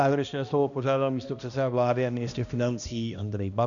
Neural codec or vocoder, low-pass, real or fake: codec, 16 kHz, 1 kbps, X-Codec, HuBERT features, trained on balanced general audio; 7.2 kHz; fake